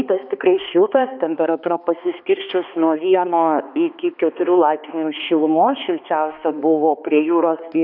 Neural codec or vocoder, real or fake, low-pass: codec, 16 kHz, 2 kbps, X-Codec, HuBERT features, trained on balanced general audio; fake; 5.4 kHz